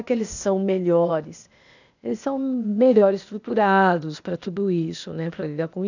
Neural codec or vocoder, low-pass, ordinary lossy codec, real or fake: codec, 16 kHz, 0.8 kbps, ZipCodec; 7.2 kHz; none; fake